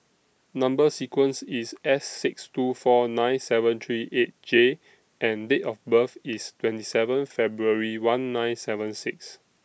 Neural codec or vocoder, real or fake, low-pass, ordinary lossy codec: none; real; none; none